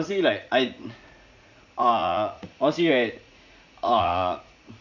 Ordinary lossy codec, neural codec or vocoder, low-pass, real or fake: none; vocoder, 44.1 kHz, 80 mel bands, Vocos; 7.2 kHz; fake